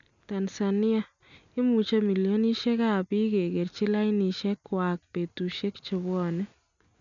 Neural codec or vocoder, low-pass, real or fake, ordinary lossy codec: none; 7.2 kHz; real; none